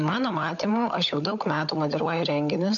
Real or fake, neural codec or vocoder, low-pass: fake; codec, 16 kHz, 16 kbps, FunCodec, trained on LibriTTS, 50 frames a second; 7.2 kHz